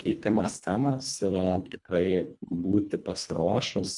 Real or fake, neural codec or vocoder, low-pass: fake; codec, 24 kHz, 1.5 kbps, HILCodec; 10.8 kHz